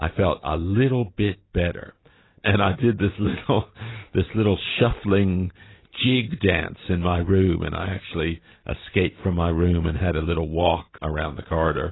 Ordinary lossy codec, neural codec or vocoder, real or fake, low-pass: AAC, 16 kbps; none; real; 7.2 kHz